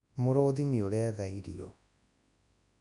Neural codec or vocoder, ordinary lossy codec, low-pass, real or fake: codec, 24 kHz, 0.9 kbps, WavTokenizer, large speech release; none; 10.8 kHz; fake